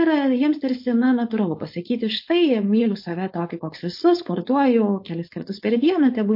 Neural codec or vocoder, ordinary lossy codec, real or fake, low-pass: codec, 16 kHz, 4.8 kbps, FACodec; MP3, 32 kbps; fake; 5.4 kHz